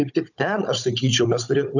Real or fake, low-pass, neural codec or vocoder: fake; 7.2 kHz; codec, 16 kHz, 16 kbps, FunCodec, trained on Chinese and English, 50 frames a second